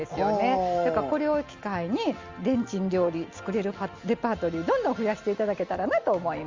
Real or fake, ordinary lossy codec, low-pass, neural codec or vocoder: real; Opus, 32 kbps; 7.2 kHz; none